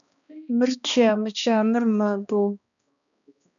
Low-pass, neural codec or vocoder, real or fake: 7.2 kHz; codec, 16 kHz, 2 kbps, X-Codec, HuBERT features, trained on balanced general audio; fake